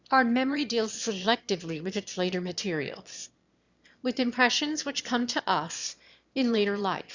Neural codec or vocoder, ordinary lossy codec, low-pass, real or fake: autoencoder, 22.05 kHz, a latent of 192 numbers a frame, VITS, trained on one speaker; Opus, 64 kbps; 7.2 kHz; fake